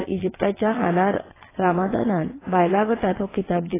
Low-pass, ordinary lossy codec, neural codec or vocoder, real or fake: 3.6 kHz; AAC, 16 kbps; vocoder, 22.05 kHz, 80 mel bands, WaveNeXt; fake